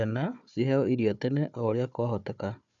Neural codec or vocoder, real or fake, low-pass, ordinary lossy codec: codec, 16 kHz, 4 kbps, FunCodec, trained on Chinese and English, 50 frames a second; fake; 7.2 kHz; none